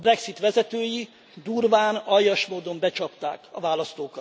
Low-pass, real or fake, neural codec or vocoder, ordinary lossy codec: none; real; none; none